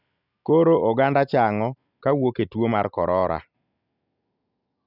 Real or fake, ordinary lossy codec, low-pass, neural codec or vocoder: real; none; 5.4 kHz; none